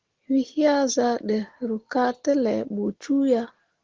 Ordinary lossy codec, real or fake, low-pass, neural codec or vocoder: Opus, 16 kbps; real; 7.2 kHz; none